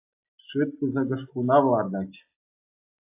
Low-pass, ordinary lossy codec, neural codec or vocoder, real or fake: 3.6 kHz; AAC, 32 kbps; none; real